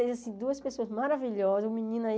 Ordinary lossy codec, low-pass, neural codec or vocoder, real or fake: none; none; none; real